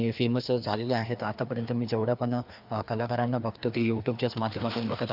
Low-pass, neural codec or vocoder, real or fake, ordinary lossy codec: 5.4 kHz; codec, 16 kHz, 2 kbps, FreqCodec, larger model; fake; none